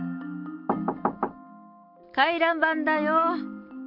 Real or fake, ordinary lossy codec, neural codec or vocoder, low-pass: real; MP3, 48 kbps; none; 5.4 kHz